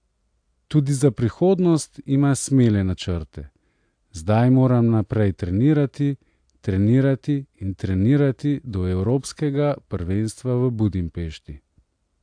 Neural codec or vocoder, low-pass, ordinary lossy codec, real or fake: none; 9.9 kHz; AAC, 64 kbps; real